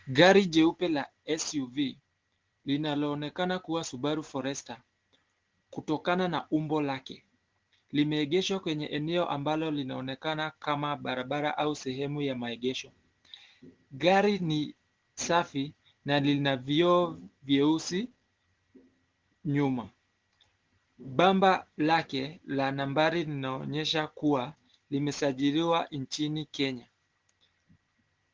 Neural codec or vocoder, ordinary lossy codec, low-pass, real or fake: none; Opus, 16 kbps; 7.2 kHz; real